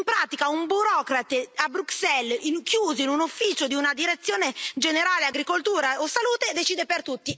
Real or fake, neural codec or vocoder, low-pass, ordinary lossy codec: real; none; none; none